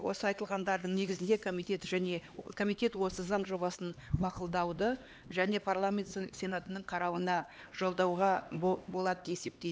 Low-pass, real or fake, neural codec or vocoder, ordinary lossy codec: none; fake; codec, 16 kHz, 2 kbps, X-Codec, HuBERT features, trained on LibriSpeech; none